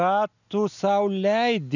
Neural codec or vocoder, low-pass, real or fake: none; 7.2 kHz; real